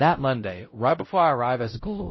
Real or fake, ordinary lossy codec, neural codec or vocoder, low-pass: fake; MP3, 24 kbps; codec, 16 kHz, 0.5 kbps, X-Codec, WavLM features, trained on Multilingual LibriSpeech; 7.2 kHz